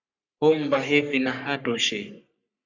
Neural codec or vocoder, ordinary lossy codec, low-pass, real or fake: codec, 44.1 kHz, 3.4 kbps, Pupu-Codec; Opus, 64 kbps; 7.2 kHz; fake